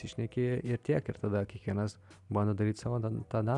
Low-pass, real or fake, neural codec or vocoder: 10.8 kHz; real; none